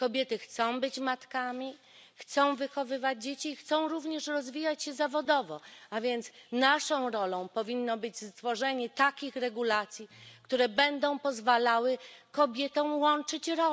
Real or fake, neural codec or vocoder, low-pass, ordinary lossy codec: real; none; none; none